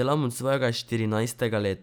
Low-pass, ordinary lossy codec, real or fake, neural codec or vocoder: none; none; real; none